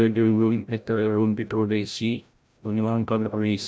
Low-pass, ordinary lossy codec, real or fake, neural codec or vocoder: none; none; fake; codec, 16 kHz, 0.5 kbps, FreqCodec, larger model